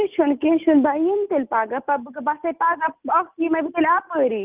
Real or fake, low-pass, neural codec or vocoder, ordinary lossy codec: real; 3.6 kHz; none; Opus, 32 kbps